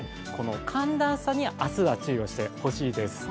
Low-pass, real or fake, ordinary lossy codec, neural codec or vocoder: none; real; none; none